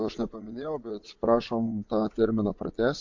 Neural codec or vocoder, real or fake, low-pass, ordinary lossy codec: vocoder, 22.05 kHz, 80 mel bands, Vocos; fake; 7.2 kHz; MP3, 48 kbps